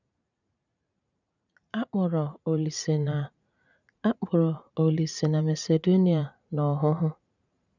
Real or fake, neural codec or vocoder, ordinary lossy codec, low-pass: fake; vocoder, 44.1 kHz, 80 mel bands, Vocos; none; 7.2 kHz